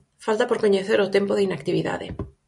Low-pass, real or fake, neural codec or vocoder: 10.8 kHz; real; none